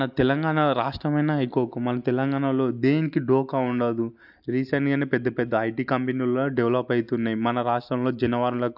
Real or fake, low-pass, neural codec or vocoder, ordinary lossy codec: real; 5.4 kHz; none; AAC, 48 kbps